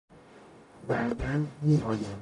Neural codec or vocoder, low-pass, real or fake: codec, 44.1 kHz, 0.9 kbps, DAC; 10.8 kHz; fake